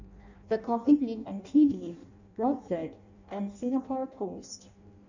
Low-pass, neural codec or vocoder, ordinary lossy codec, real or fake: 7.2 kHz; codec, 16 kHz in and 24 kHz out, 0.6 kbps, FireRedTTS-2 codec; none; fake